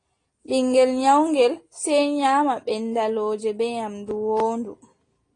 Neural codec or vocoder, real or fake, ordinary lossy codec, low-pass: none; real; AAC, 32 kbps; 9.9 kHz